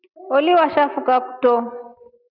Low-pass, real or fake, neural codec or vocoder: 5.4 kHz; real; none